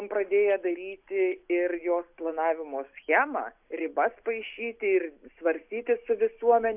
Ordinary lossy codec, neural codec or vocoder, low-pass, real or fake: AAC, 32 kbps; none; 3.6 kHz; real